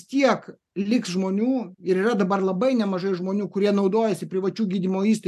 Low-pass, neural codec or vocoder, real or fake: 14.4 kHz; none; real